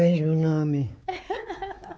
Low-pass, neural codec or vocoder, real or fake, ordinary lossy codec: none; codec, 16 kHz, 4 kbps, X-Codec, WavLM features, trained on Multilingual LibriSpeech; fake; none